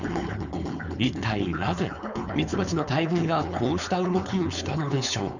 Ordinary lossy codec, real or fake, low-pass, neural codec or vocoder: none; fake; 7.2 kHz; codec, 16 kHz, 4.8 kbps, FACodec